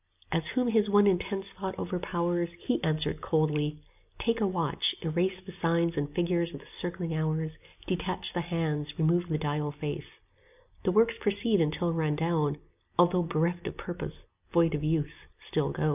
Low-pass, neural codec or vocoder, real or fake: 3.6 kHz; none; real